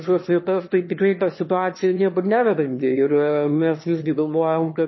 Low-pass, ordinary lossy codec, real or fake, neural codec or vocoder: 7.2 kHz; MP3, 24 kbps; fake; autoencoder, 22.05 kHz, a latent of 192 numbers a frame, VITS, trained on one speaker